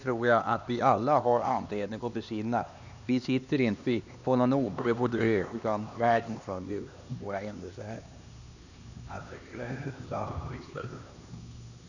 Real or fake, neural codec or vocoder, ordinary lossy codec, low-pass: fake; codec, 16 kHz, 2 kbps, X-Codec, HuBERT features, trained on LibriSpeech; none; 7.2 kHz